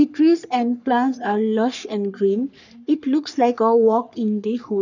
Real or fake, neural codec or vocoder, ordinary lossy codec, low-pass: fake; codec, 44.1 kHz, 3.4 kbps, Pupu-Codec; none; 7.2 kHz